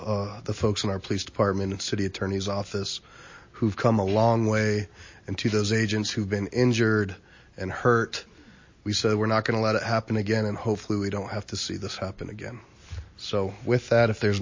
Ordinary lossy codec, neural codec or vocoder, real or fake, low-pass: MP3, 32 kbps; none; real; 7.2 kHz